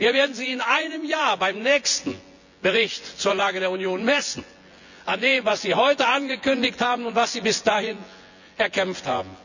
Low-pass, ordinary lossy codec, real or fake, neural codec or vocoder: 7.2 kHz; none; fake; vocoder, 24 kHz, 100 mel bands, Vocos